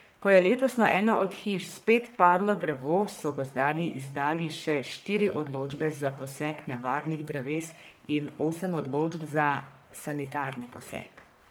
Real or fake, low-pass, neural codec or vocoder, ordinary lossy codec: fake; none; codec, 44.1 kHz, 1.7 kbps, Pupu-Codec; none